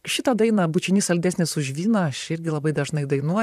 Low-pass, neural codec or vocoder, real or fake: 14.4 kHz; vocoder, 44.1 kHz, 128 mel bands, Pupu-Vocoder; fake